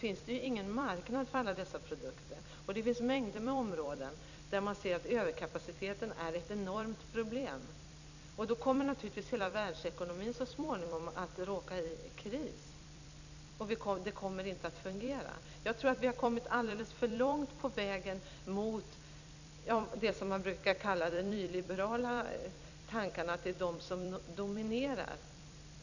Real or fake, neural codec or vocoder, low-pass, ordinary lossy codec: fake; vocoder, 44.1 kHz, 128 mel bands every 256 samples, BigVGAN v2; 7.2 kHz; none